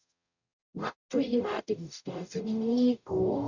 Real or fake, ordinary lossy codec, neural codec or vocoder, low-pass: fake; none; codec, 44.1 kHz, 0.9 kbps, DAC; 7.2 kHz